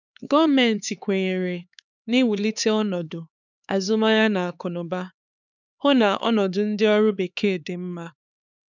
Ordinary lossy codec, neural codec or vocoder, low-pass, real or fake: none; codec, 16 kHz, 4 kbps, X-Codec, HuBERT features, trained on LibriSpeech; 7.2 kHz; fake